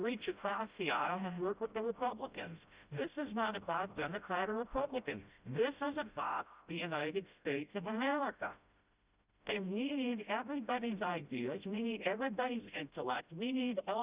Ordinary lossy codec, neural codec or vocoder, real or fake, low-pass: Opus, 64 kbps; codec, 16 kHz, 0.5 kbps, FreqCodec, smaller model; fake; 3.6 kHz